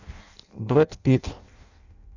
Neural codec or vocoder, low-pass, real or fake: codec, 16 kHz in and 24 kHz out, 0.6 kbps, FireRedTTS-2 codec; 7.2 kHz; fake